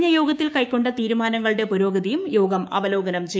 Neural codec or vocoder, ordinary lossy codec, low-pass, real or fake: codec, 16 kHz, 6 kbps, DAC; none; none; fake